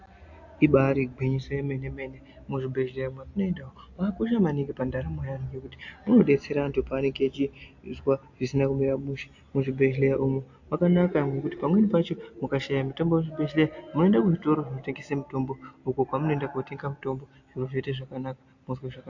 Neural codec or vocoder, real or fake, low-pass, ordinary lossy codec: none; real; 7.2 kHz; AAC, 48 kbps